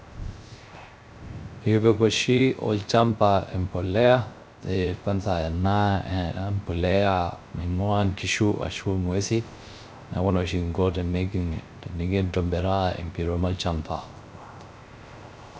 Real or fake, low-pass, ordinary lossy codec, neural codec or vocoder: fake; none; none; codec, 16 kHz, 0.3 kbps, FocalCodec